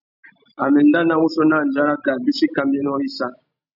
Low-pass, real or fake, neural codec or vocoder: 5.4 kHz; real; none